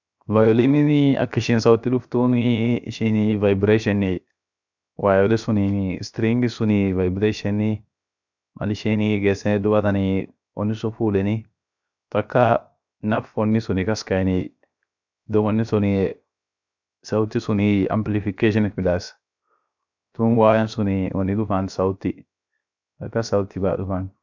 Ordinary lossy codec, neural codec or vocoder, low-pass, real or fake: none; codec, 16 kHz, 0.7 kbps, FocalCodec; 7.2 kHz; fake